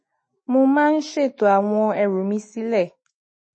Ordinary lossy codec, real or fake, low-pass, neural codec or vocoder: MP3, 32 kbps; fake; 9.9 kHz; autoencoder, 48 kHz, 128 numbers a frame, DAC-VAE, trained on Japanese speech